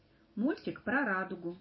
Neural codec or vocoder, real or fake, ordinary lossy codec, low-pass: vocoder, 44.1 kHz, 128 mel bands every 256 samples, BigVGAN v2; fake; MP3, 24 kbps; 7.2 kHz